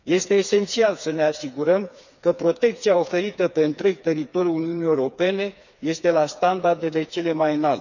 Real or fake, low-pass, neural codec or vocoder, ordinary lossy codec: fake; 7.2 kHz; codec, 16 kHz, 4 kbps, FreqCodec, smaller model; none